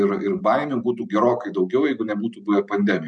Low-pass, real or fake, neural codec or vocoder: 9.9 kHz; real; none